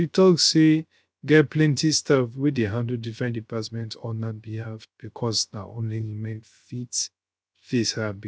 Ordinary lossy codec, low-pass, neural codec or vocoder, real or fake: none; none; codec, 16 kHz, 0.3 kbps, FocalCodec; fake